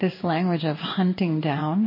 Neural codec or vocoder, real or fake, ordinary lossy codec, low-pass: codec, 16 kHz in and 24 kHz out, 1 kbps, XY-Tokenizer; fake; MP3, 24 kbps; 5.4 kHz